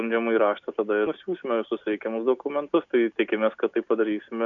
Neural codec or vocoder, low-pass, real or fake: none; 7.2 kHz; real